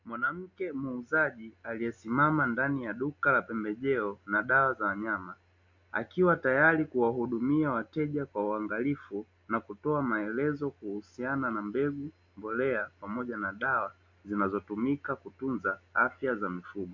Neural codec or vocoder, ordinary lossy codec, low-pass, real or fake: none; MP3, 48 kbps; 7.2 kHz; real